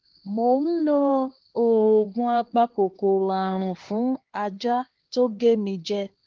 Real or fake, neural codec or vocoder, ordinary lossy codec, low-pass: fake; codec, 16 kHz, 2 kbps, X-Codec, HuBERT features, trained on LibriSpeech; Opus, 16 kbps; 7.2 kHz